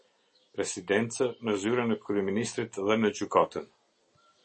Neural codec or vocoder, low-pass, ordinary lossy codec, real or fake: none; 10.8 kHz; MP3, 32 kbps; real